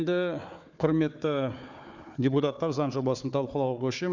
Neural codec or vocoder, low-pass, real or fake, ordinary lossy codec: codec, 16 kHz, 4 kbps, FunCodec, trained on Chinese and English, 50 frames a second; 7.2 kHz; fake; none